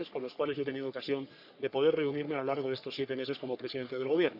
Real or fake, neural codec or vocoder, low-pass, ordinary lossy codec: fake; codec, 44.1 kHz, 3.4 kbps, Pupu-Codec; 5.4 kHz; none